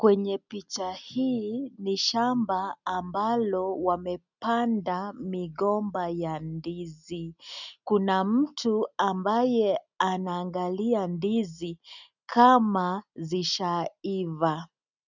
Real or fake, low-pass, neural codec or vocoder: real; 7.2 kHz; none